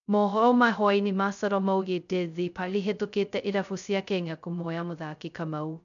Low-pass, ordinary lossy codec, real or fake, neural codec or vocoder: 7.2 kHz; none; fake; codec, 16 kHz, 0.2 kbps, FocalCodec